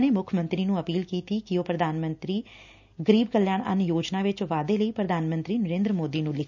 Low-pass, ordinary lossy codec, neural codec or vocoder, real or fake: 7.2 kHz; none; none; real